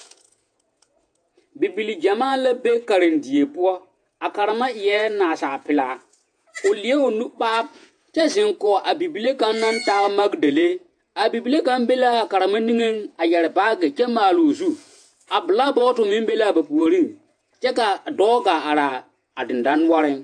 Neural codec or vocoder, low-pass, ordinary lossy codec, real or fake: vocoder, 48 kHz, 128 mel bands, Vocos; 9.9 kHz; AAC, 64 kbps; fake